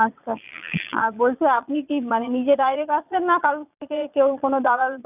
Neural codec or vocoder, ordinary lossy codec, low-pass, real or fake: vocoder, 22.05 kHz, 80 mel bands, Vocos; none; 3.6 kHz; fake